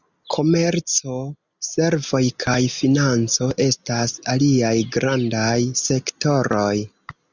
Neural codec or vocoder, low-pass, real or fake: none; 7.2 kHz; real